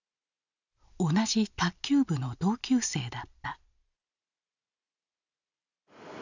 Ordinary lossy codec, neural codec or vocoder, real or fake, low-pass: none; none; real; 7.2 kHz